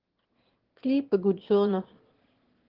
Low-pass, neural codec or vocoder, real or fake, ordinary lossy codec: 5.4 kHz; autoencoder, 22.05 kHz, a latent of 192 numbers a frame, VITS, trained on one speaker; fake; Opus, 16 kbps